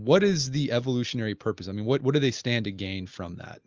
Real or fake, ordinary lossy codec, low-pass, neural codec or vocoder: real; Opus, 24 kbps; 7.2 kHz; none